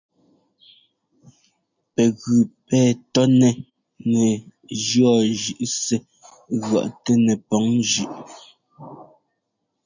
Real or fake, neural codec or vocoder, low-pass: real; none; 7.2 kHz